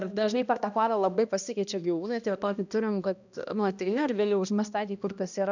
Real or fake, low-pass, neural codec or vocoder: fake; 7.2 kHz; codec, 16 kHz, 1 kbps, X-Codec, HuBERT features, trained on balanced general audio